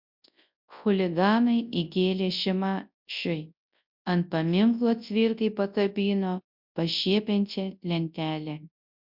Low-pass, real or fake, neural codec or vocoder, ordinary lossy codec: 5.4 kHz; fake; codec, 24 kHz, 0.9 kbps, WavTokenizer, large speech release; MP3, 48 kbps